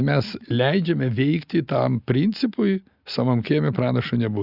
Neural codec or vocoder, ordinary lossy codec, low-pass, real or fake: none; Opus, 64 kbps; 5.4 kHz; real